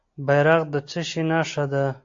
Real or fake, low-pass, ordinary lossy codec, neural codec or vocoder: real; 7.2 kHz; AAC, 48 kbps; none